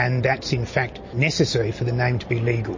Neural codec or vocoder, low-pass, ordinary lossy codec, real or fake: none; 7.2 kHz; MP3, 32 kbps; real